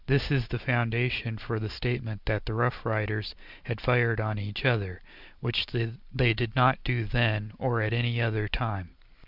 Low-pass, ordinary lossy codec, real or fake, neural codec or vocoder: 5.4 kHz; Opus, 64 kbps; real; none